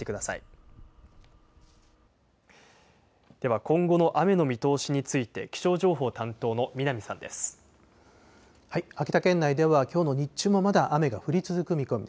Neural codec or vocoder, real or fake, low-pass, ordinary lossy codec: none; real; none; none